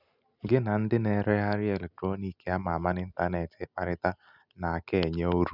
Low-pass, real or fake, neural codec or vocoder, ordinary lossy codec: 5.4 kHz; real; none; none